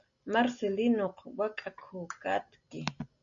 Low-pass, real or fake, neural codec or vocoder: 7.2 kHz; real; none